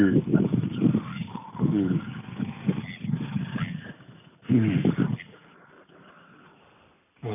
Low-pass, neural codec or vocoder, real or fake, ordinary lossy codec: 3.6 kHz; none; real; none